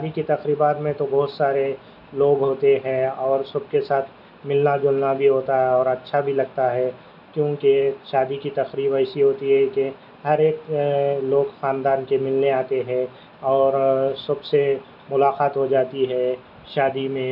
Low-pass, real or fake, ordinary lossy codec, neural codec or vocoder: 5.4 kHz; real; none; none